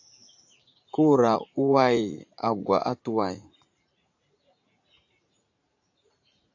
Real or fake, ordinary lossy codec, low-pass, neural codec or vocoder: fake; AAC, 48 kbps; 7.2 kHz; vocoder, 44.1 kHz, 80 mel bands, Vocos